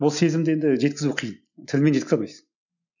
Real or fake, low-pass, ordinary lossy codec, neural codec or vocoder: real; 7.2 kHz; none; none